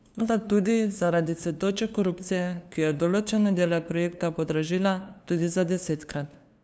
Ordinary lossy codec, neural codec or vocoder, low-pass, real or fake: none; codec, 16 kHz, 2 kbps, FunCodec, trained on LibriTTS, 25 frames a second; none; fake